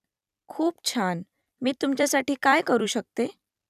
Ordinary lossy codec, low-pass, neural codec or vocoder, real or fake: none; 14.4 kHz; vocoder, 44.1 kHz, 128 mel bands every 256 samples, BigVGAN v2; fake